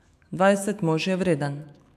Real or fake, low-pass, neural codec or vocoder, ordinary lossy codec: fake; 14.4 kHz; autoencoder, 48 kHz, 128 numbers a frame, DAC-VAE, trained on Japanese speech; AAC, 96 kbps